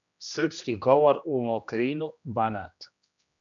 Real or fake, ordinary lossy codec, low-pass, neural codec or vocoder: fake; MP3, 64 kbps; 7.2 kHz; codec, 16 kHz, 1 kbps, X-Codec, HuBERT features, trained on general audio